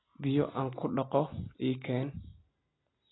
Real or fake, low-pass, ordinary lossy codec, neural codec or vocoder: real; 7.2 kHz; AAC, 16 kbps; none